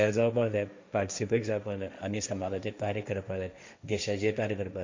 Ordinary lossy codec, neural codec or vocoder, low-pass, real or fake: MP3, 64 kbps; codec, 16 kHz, 1.1 kbps, Voila-Tokenizer; 7.2 kHz; fake